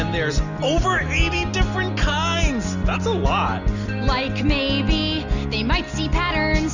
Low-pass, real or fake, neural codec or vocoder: 7.2 kHz; real; none